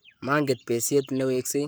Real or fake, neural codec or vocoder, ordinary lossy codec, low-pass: fake; codec, 44.1 kHz, 7.8 kbps, Pupu-Codec; none; none